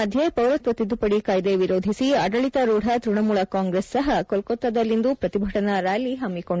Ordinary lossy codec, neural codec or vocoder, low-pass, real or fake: none; none; none; real